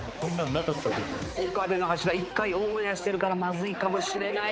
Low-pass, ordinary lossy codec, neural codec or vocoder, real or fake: none; none; codec, 16 kHz, 4 kbps, X-Codec, HuBERT features, trained on balanced general audio; fake